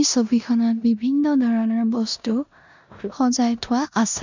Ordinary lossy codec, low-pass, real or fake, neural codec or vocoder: none; 7.2 kHz; fake; codec, 16 kHz in and 24 kHz out, 0.9 kbps, LongCat-Audio-Codec, four codebook decoder